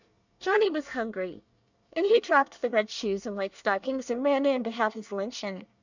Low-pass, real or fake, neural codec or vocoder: 7.2 kHz; fake; codec, 24 kHz, 1 kbps, SNAC